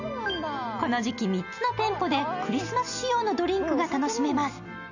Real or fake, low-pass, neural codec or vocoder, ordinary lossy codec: real; 7.2 kHz; none; none